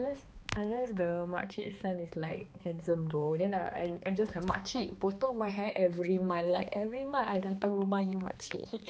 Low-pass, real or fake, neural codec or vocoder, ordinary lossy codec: none; fake; codec, 16 kHz, 2 kbps, X-Codec, HuBERT features, trained on balanced general audio; none